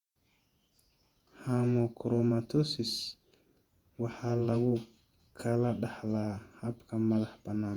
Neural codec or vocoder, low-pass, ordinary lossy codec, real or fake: vocoder, 48 kHz, 128 mel bands, Vocos; 19.8 kHz; Opus, 64 kbps; fake